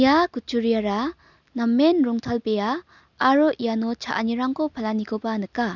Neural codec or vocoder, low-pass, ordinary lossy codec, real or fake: none; 7.2 kHz; none; real